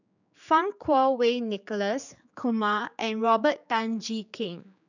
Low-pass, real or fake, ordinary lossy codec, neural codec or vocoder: 7.2 kHz; fake; none; codec, 16 kHz, 4 kbps, X-Codec, HuBERT features, trained on general audio